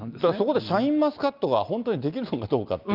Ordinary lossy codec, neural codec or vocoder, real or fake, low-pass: Opus, 24 kbps; none; real; 5.4 kHz